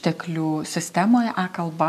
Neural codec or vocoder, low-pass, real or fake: none; 14.4 kHz; real